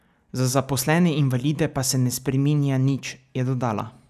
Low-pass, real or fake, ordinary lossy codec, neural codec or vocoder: 14.4 kHz; real; none; none